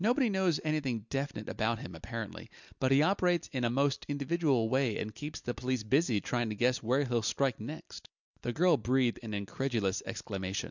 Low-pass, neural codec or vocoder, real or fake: 7.2 kHz; none; real